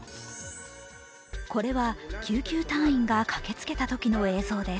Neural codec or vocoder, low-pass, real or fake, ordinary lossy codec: none; none; real; none